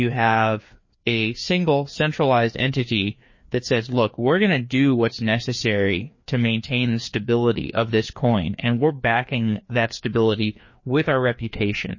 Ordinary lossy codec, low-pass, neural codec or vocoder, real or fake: MP3, 32 kbps; 7.2 kHz; codec, 16 kHz, 2 kbps, FreqCodec, larger model; fake